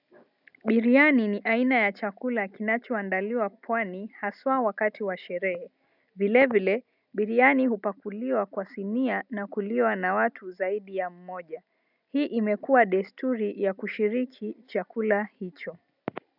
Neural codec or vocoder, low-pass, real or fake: none; 5.4 kHz; real